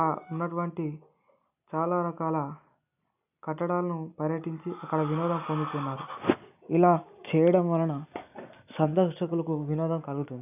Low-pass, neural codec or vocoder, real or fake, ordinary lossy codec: 3.6 kHz; none; real; none